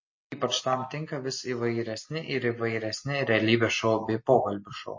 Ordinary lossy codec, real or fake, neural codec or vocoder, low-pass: MP3, 32 kbps; real; none; 7.2 kHz